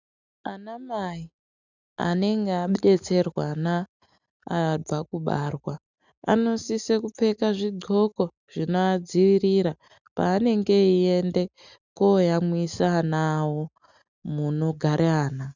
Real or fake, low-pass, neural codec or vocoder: real; 7.2 kHz; none